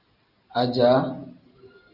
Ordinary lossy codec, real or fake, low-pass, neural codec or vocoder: Opus, 64 kbps; real; 5.4 kHz; none